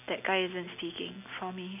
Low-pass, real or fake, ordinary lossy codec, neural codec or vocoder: 3.6 kHz; real; none; none